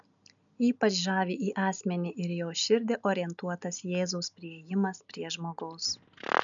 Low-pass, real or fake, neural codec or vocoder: 7.2 kHz; real; none